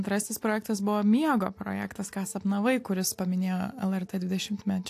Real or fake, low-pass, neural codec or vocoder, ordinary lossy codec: real; 14.4 kHz; none; AAC, 64 kbps